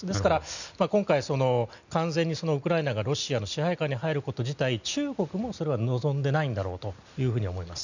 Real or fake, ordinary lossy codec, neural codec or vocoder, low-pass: real; none; none; 7.2 kHz